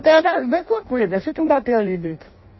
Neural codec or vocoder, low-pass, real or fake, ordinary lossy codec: codec, 16 kHz in and 24 kHz out, 0.6 kbps, FireRedTTS-2 codec; 7.2 kHz; fake; MP3, 24 kbps